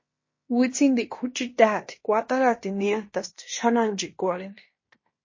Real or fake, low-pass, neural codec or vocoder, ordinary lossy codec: fake; 7.2 kHz; codec, 16 kHz in and 24 kHz out, 0.9 kbps, LongCat-Audio-Codec, fine tuned four codebook decoder; MP3, 32 kbps